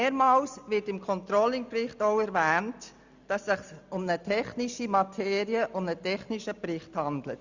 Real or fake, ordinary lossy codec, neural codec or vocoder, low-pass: real; Opus, 64 kbps; none; 7.2 kHz